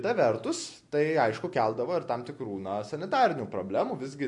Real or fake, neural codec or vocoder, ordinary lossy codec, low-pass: real; none; MP3, 48 kbps; 10.8 kHz